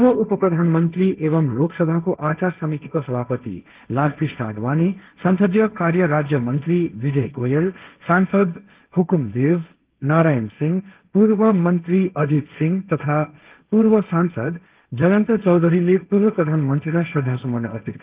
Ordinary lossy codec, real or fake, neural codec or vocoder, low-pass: Opus, 32 kbps; fake; codec, 16 kHz, 1.1 kbps, Voila-Tokenizer; 3.6 kHz